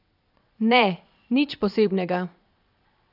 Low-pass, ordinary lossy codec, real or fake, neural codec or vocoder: 5.4 kHz; none; fake; vocoder, 22.05 kHz, 80 mel bands, Vocos